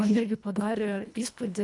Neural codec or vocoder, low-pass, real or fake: codec, 24 kHz, 1.5 kbps, HILCodec; 10.8 kHz; fake